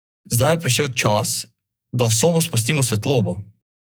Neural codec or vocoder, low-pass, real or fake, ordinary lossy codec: codec, 44.1 kHz, 2.6 kbps, SNAC; none; fake; none